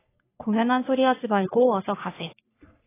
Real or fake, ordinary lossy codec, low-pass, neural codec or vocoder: fake; AAC, 16 kbps; 3.6 kHz; codec, 44.1 kHz, 3.4 kbps, Pupu-Codec